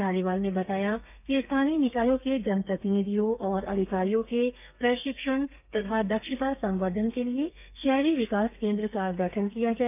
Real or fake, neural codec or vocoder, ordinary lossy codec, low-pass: fake; codec, 32 kHz, 1.9 kbps, SNAC; none; 3.6 kHz